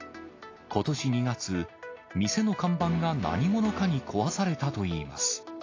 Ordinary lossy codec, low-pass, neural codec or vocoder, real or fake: AAC, 32 kbps; 7.2 kHz; none; real